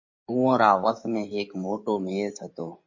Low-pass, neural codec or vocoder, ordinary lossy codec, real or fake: 7.2 kHz; codec, 16 kHz in and 24 kHz out, 2.2 kbps, FireRedTTS-2 codec; MP3, 32 kbps; fake